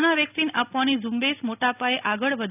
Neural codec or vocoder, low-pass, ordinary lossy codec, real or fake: none; 3.6 kHz; none; real